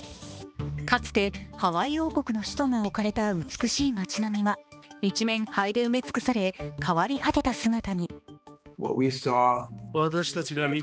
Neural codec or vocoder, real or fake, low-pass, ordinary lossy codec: codec, 16 kHz, 2 kbps, X-Codec, HuBERT features, trained on balanced general audio; fake; none; none